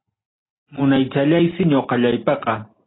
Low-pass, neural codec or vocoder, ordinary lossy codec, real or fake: 7.2 kHz; none; AAC, 16 kbps; real